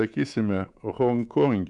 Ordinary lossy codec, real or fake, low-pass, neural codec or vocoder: MP3, 96 kbps; fake; 10.8 kHz; codec, 24 kHz, 3.1 kbps, DualCodec